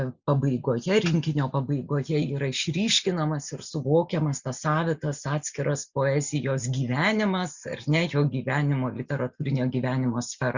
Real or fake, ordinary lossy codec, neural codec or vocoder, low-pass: real; Opus, 64 kbps; none; 7.2 kHz